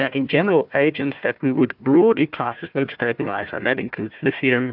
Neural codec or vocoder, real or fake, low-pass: codec, 16 kHz, 1 kbps, FunCodec, trained on Chinese and English, 50 frames a second; fake; 5.4 kHz